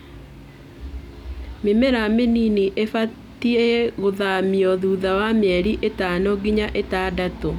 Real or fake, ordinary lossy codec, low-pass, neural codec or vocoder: real; none; 19.8 kHz; none